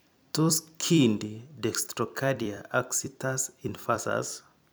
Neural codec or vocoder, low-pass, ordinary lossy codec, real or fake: vocoder, 44.1 kHz, 128 mel bands every 256 samples, BigVGAN v2; none; none; fake